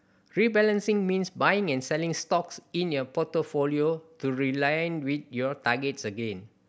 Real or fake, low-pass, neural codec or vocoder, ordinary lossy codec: real; none; none; none